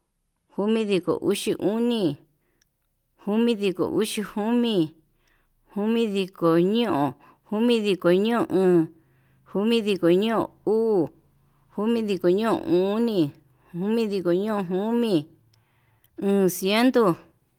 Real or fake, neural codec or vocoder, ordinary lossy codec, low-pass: real; none; Opus, 24 kbps; 19.8 kHz